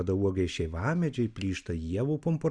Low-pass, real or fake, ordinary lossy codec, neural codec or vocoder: 9.9 kHz; real; Opus, 64 kbps; none